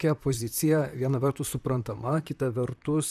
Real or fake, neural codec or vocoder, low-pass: fake; vocoder, 44.1 kHz, 128 mel bands, Pupu-Vocoder; 14.4 kHz